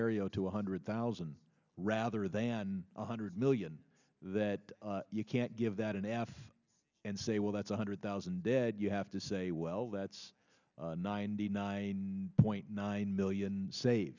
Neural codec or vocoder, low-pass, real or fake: none; 7.2 kHz; real